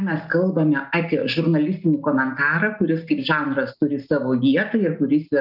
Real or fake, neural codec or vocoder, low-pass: real; none; 5.4 kHz